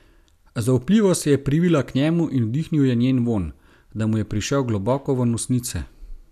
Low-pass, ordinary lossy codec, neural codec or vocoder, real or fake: 14.4 kHz; none; none; real